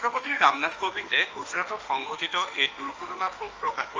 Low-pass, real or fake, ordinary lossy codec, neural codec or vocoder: 7.2 kHz; fake; Opus, 24 kbps; autoencoder, 48 kHz, 32 numbers a frame, DAC-VAE, trained on Japanese speech